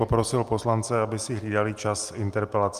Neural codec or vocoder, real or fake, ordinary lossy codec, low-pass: none; real; Opus, 32 kbps; 14.4 kHz